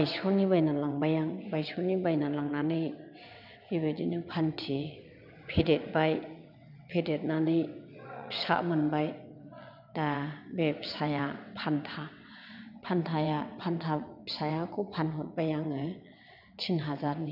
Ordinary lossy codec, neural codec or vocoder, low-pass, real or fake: none; vocoder, 22.05 kHz, 80 mel bands, WaveNeXt; 5.4 kHz; fake